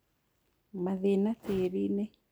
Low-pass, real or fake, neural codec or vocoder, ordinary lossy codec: none; real; none; none